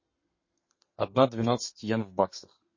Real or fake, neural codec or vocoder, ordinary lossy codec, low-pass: fake; codec, 32 kHz, 1.9 kbps, SNAC; MP3, 32 kbps; 7.2 kHz